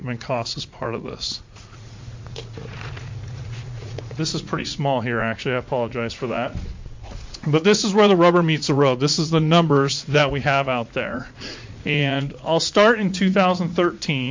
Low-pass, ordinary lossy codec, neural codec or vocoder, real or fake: 7.2 kHz; MP3, 48 kbps; vocoder, 44.1 kHz, 80 mel bands, Vocos; fake